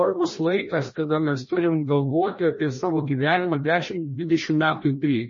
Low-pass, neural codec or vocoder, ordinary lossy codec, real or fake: 7.2 kHz; codec, 16 kHz, 1 kbps, FreqCodec, larger model; MP3, 32 kbps; fake